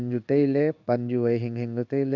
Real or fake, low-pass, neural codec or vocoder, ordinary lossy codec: fake; 7.2 kHz; codec, 16 kHz in and 24 kHz out, 1 kbps, XY-Tokenizer; none